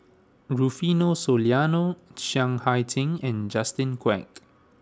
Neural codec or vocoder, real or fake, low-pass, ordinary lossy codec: none; real; none; none